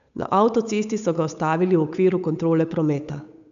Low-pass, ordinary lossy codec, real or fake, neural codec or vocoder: 7.2 kHz; none; fake; codec, 16 kHz, 8 kbps, FunCodec, trained on Chinese and English, 25 frames a second